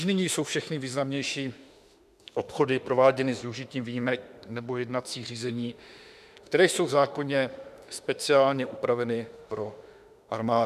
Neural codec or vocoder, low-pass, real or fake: autoencoder, 48 kHz, 32 numbers a frame, DAC-VAE, trained on Japanese speech; 14.4 kHz; fake